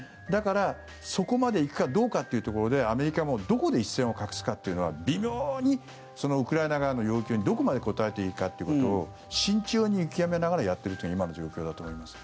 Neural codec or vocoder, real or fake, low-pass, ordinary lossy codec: none; real; none; none